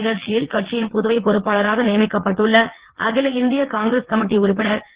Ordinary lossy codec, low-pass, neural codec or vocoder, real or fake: Opus, 16 kbps; 3.6 kHz; vocoder, 22.05 kHz, 80 mel bands, WaveNeXt; fake